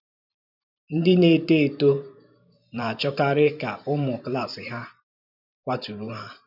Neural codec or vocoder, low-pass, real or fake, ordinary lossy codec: vocoder, 44.1 kHz, 128 mel bands every 512 samples, BigVGAN v2; 5.4 kHz; fake; none